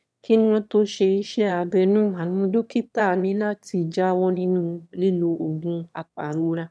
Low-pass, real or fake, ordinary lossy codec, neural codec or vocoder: none; fake; none; autoencoder, 22.05 kHz, a latent of 192 numbers a frame, VITS, trained on one speaker